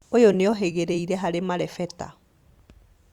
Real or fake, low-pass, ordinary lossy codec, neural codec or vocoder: fake; 19.8 kHz; Opus, 64 kbps; vocoder, 44.1 kHz, 128 mel bands every 256 samples, BigVGAN v2